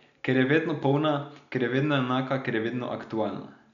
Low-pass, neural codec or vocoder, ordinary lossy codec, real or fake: 7.2 kHz; none; none; real